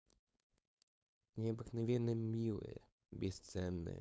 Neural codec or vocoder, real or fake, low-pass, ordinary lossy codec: codec, 16 kHz, 4.8 kbps, FACodec; fake; none; none